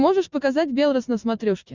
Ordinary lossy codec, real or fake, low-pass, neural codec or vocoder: Opus, 64 kbps; real; 7.2 kHz; none